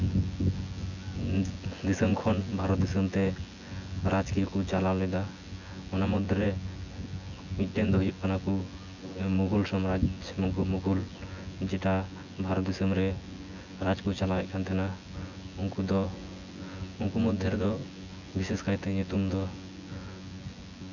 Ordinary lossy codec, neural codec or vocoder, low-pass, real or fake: none; vocoder, 24 kHz, 100 mel bands, Vocos; 7.2 kHz; fake